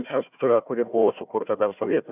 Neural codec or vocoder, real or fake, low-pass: codec, 16 kHz, 1 kbps, FunCodec, trained on Chinese and English, 50 frames a second; fake; 3.6 kHz